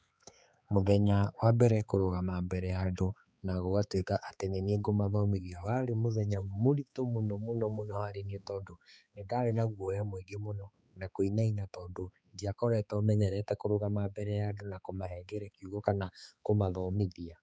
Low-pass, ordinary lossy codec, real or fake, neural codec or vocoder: none; none; fake; codec, 16 kHz, 4 kbps, X-Codec, HuBERT features, trained on balanced general audio